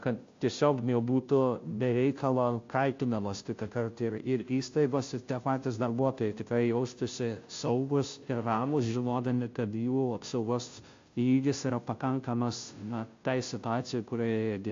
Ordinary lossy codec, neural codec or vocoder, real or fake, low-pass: AAC, 48 kbps; codec, 16 kHz, 0.5 kbps, FunCodec, trained on Chinese and English, 25 frames a second; fake; 7.2 kHz